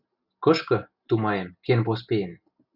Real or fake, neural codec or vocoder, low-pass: real; none; 5.4 kHz